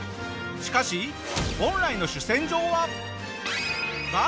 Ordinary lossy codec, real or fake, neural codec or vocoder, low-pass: none; real; none; none